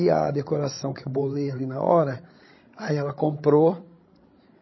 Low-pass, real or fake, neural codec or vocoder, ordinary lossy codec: 7.2 kHz; fake; codec, 16 kHz, 16 kbps, FreqCodec, larger model; MP3, 24 kbps